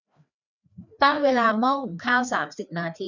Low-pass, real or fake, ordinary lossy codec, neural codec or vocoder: 7.2 kHz; fake; none; codec, 16 kHz, 2 kbps, FreqCodec, larger model